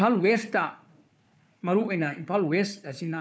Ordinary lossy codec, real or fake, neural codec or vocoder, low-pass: none; fake; codec, 16 kHz, 4 kbps, FunCodec, trained on Chinese and English, 50 frames a second; none